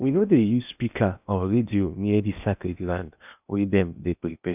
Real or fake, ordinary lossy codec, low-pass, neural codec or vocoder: fake; none; 3.6 kHz; codec, 16 kHz in and 24 kHz out, 0.8 kbps, FocalCodec, streaming, 65536 codes